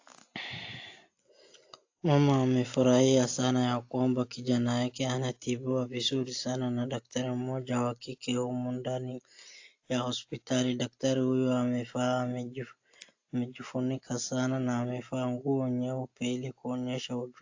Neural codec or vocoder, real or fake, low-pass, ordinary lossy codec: none; real; 7.2 kHz; AAC, 48 kbps